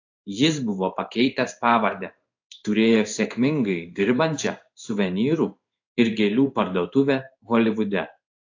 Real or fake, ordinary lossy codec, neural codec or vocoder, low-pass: fake; AAC, 48 kbps; codec, 16 kHz in and 24 kHz out, 1 kbps, XY-Tokenizer; 7.2 kHz